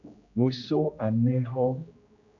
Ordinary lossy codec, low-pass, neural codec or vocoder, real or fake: AAC, 64 kbps; 7.2 kHz; codec, 16 kHz, 1 kbps, X-Codec, HuBERT features, trained on general audio; fake